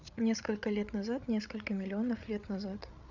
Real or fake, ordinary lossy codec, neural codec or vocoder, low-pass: fake; none; codec, 16 kHz, 8 kbps, FreqCodec, larger model; 7.2 kHz